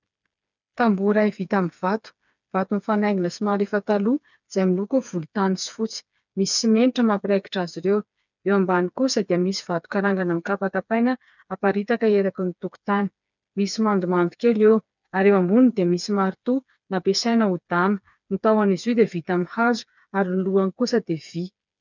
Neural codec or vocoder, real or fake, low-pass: codec, 16 kHz, 4 kbps, FreqCodec, smaller model; fake; 7.2 kHz